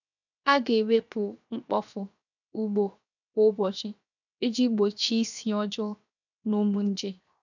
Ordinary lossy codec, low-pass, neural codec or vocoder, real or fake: none; 7.2 kHz; codec, 16 kHz, 0.7 kbps, FocalCodec; fake